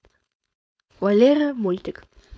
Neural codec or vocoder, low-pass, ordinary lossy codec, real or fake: codec, 16 kHz, 4.8 kbps, FACodec; none; none; fake